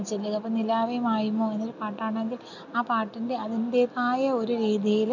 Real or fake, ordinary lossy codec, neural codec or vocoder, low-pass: real; none; none; 7.2 kHz